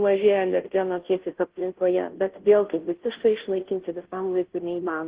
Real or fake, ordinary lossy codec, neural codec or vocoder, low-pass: fake; Opus, 16 kbps; codec, 16 kHz, 0.5 kbps, FunCodec, trained on Chinese and English, 25 frames a second; 3.6 kHz